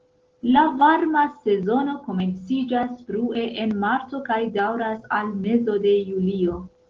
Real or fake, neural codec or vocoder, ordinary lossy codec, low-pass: real; none; Opus, 16 kbps; 7.2 kHz